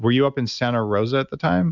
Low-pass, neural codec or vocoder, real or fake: 7.2 kHz; none; real